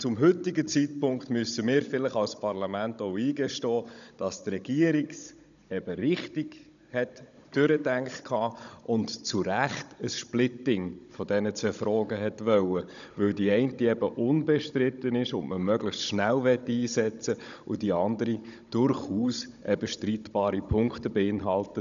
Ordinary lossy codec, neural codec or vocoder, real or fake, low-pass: none; codec, 16 kHz, 16 kbps, FunCodec, trained on Chinese and English, 50 frames a second; fake; 7.2 kHz